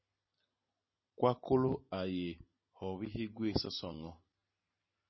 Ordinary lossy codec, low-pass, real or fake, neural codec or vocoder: MP3, 24 kbps; 7.2 kHz; real; none